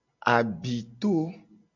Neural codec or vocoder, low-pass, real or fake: none; 7.2 kHz; real